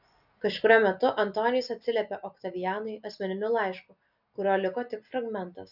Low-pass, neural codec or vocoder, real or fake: 5.4 kHz; none; real